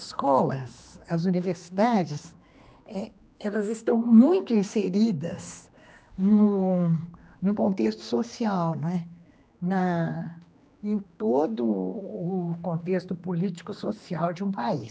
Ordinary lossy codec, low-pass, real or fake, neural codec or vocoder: none; none; fake; codec, 16 kHz, 2 kbps, X-Codec, HuBERT features, trained on general audio